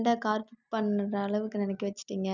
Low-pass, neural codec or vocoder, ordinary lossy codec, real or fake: 7.2 kHz; none; none; real